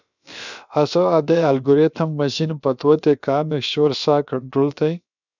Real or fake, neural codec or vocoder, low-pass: fake; codec, 16 kHz, about 1 kbps, DyCAST, with the encoder's durations; 7.2 kHz